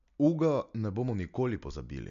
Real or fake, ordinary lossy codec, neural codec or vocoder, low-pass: real; MP3, 64 kbps; none; 7.2 kHz